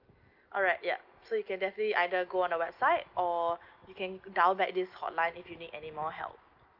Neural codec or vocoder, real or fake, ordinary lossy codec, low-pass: none; real; Opus, 24 kbps; 5.4 kHz